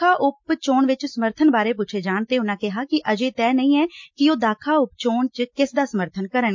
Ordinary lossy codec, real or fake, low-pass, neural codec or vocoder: MP3, 48 kbps; real; 7.2 kHz; none